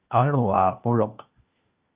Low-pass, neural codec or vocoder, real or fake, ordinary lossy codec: 3.6 kHz; codec, 16 kHz, 1 kbps, FunCodec, trained on LibriTTS, 50 frames a second; fake; Opus, 24 kbps